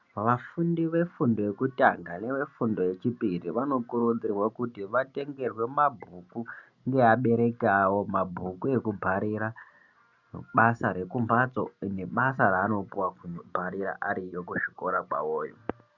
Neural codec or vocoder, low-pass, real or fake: none; 7.2 kHz; real